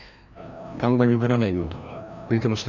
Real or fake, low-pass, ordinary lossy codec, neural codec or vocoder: fake; 7.2 kHz; none; codec, 16 kHz, 1 kbps, FreqCodec, larger model